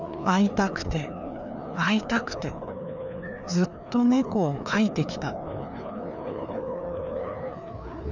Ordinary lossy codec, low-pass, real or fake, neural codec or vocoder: none; 7.2 kHz; fake; codec, 16 kHz, 2 kbps, FreqCodec, larger model